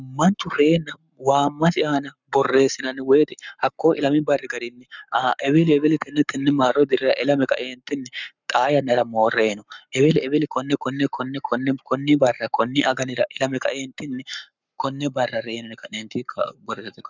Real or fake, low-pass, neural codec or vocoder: fake; 7.2 kHz; codec, 44.1 kHz, 7.8 kbps, Pupu-Codec